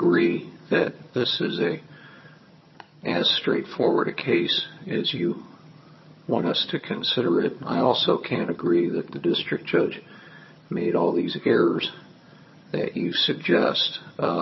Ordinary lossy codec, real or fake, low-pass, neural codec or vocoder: MP3, 24 kbps; fake; 7.2 kHz; vocoder, 22.05 kHz, 80 mel bands, HiFi-GAN